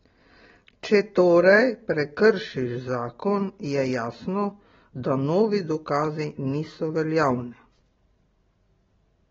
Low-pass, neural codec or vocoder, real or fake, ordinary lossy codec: 7.2 kHz; none; real; AAC, 24 kbps